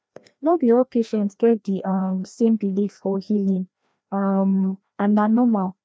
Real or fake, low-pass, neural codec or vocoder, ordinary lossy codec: fake; none; codec, 16 kHz, 1 kbps, FreqCodec, larger model; none